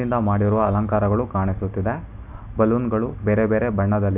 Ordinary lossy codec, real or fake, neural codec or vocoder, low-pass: none; real; none; 3.6 kHz